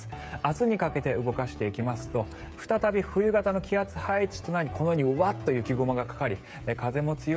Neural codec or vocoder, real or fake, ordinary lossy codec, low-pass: codec, 16 kHz, 16 kbps, FreqCodec, smaller model; fake; none; none